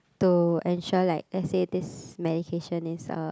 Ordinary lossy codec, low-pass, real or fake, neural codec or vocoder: none; none; real; none